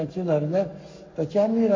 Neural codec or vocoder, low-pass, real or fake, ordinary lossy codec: codec, 44.1 kHz, 7.8 kbps, Pupu-Codec; 7.2 kHz; fake; MP3, 48 kbps